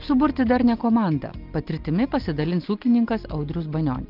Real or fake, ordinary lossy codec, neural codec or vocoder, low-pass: real; Opus, 32 kbps; none; 5.4 kHz